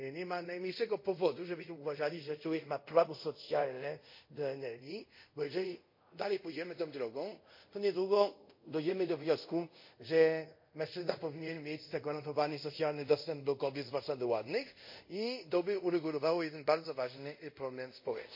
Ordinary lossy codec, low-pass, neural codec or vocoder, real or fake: MP3, 32 kbps; 5.4 kHz; codec, 24 kHz, 0.5 kbps, DualCodec; fake